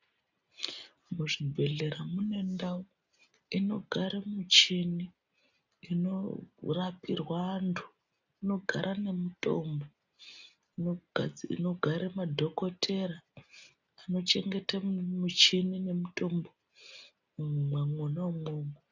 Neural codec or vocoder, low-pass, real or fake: none; 7.2 kHz; real